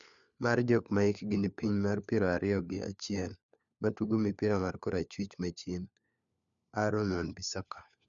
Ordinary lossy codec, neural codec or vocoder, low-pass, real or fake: none; codec, 16 kHz, 4 kbps, FunCodec, trained on LibriTTS, 50 frames a second; 7.2 kHz; fake